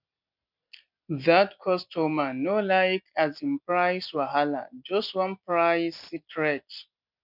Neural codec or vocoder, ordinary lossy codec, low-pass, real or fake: none; none; 5.4 kHz; real